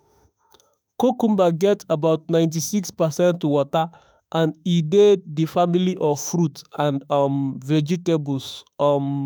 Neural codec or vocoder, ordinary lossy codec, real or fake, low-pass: autoencoder, 48 kHz, 32 numbers a frame, DAC-VAE, trained on Japanese speech; none; fake; none